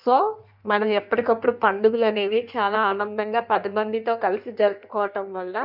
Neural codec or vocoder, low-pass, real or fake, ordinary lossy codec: codec, 16 kHz in and 24 kHz out, 1.1 kbps, FireRedTTS-2 codec; 5.4 kHz; fake; none